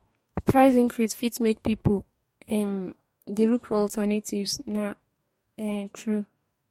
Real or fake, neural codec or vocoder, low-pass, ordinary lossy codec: fake; codec, 44.1 kHz, 2.6 kbps, DAC; 19.8 kHz; MP3, 64 kbps